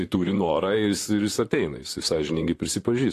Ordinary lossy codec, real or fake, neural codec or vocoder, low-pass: AAC, 48 kbps; fake; vocoder, 44.1 kHz, 128 mel bands, Pupu-Vocoder; 14.4 kHz